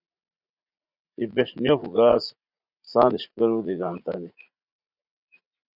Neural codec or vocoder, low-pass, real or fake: vocoder, 44.1 kHz, 80 mel bands, Vocos; 5.4 kHz; fake